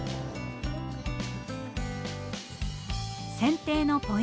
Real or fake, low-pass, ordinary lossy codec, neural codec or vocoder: real; none; none; none